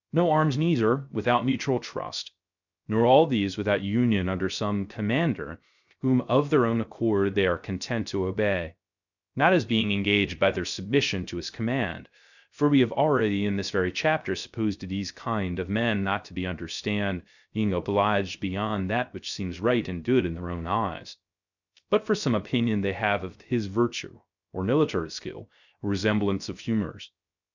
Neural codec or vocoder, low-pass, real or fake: codec, 16 kHz, 0.3 kbps, FocalCodec; 7.2 kHz; fake